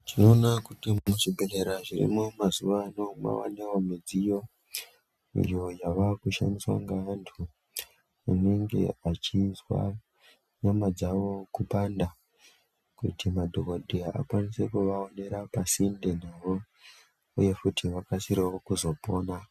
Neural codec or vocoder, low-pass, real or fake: none; 14.4 kHz; real